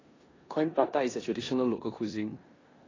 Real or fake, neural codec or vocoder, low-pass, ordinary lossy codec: fake; codec, 16 kHz in and 24 kHz out, 0.9 kbps, LongCat-Audio-Codec, four codebook decoder; 7.2 kHz; AAC, 32 kbps